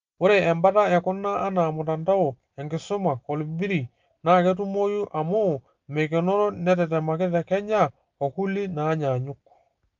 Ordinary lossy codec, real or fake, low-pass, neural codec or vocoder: Opus, 32 kbps; real; 7.2 kHz; none